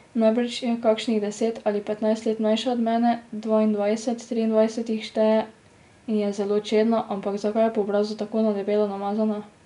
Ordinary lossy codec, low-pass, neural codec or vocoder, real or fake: none; 10.8 kHz; none; real